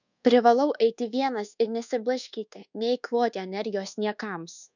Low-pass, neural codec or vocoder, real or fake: 7.2 kHz; codec, 24 kHz, 1.2 kbps, DualCodec; fake